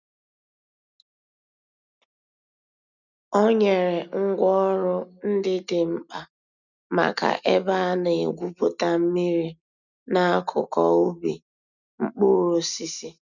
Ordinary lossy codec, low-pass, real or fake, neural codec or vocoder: none; 7.2 kHz; real; none